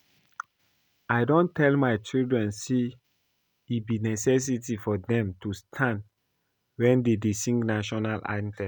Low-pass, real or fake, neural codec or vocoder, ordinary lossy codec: none; real; none; none